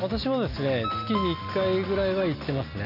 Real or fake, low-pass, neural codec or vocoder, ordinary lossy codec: real; 5.4 kHz; none; none